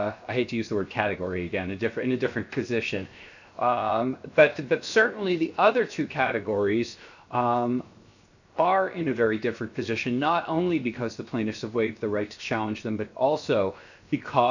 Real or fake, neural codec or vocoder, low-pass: fake; codec, 16 kHz, 0.7 kbps, FocalCodec; 7.2 kHz